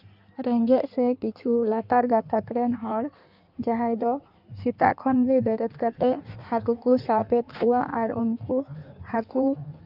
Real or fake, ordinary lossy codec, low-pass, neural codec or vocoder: fake; none; 5.4 kHz; codec, 16 kHz in and 24 kHz out, 1.1 kbps, FireRedTTS-2 codec